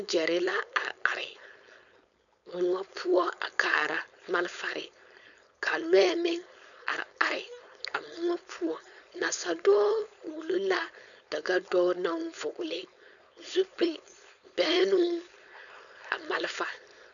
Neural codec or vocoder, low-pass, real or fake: codec, 16 kHz, 4.8 kbps, FACodec; 7.2 kHz; fake